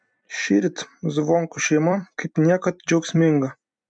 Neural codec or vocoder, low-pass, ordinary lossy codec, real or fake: vocoder, 44.1 kHz, 128 mel bands every 256 samples, BigVGAN v2; 9.9 kHz; MP3, 64 kbps; fake